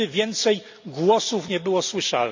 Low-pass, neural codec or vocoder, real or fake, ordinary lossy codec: 7.2 kHz; none; real; MP3, 64 kbps